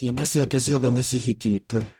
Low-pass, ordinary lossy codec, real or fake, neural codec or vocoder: 19.8 kHz; none; fake; codec, 44.1 kHz, 0.9 kbps, DAC